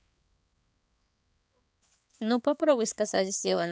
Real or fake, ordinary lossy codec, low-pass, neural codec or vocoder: fake; none; none; codec, 16 kHz, 4 kbps, X-Codec, HuBERT features, trained on balanced general audio